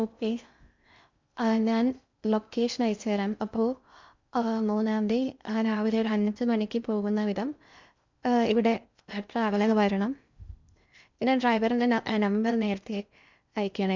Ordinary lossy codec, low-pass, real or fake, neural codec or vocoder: MP3, 64 kbps; 7.2 kHz; fake; codec, 16 kHz in and 24 kHz out, 0.6 kbps, FocalCodec, streaming, 2048 codes